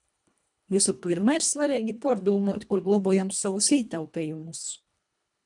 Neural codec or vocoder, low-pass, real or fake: codec, 24 kHz, 1.5 kbps, HILCodec; 10.8 kHz; fake